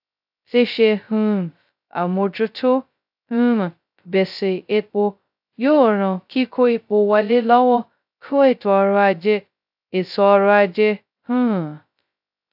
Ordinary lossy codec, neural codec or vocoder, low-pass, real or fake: AAC, 48 kbps; codec, 16 kHz, 0.2 kbps, FocalCodec; 5.4 kHz; fake